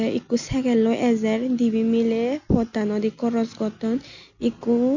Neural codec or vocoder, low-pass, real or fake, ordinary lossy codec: none; 7.2 kHz; real; none